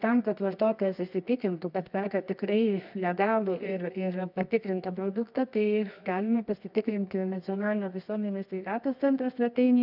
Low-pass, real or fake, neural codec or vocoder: 5.4 kHz; fake; codec, 24 kHz, 0.9 kbps, WavTokenizer, medium music audio release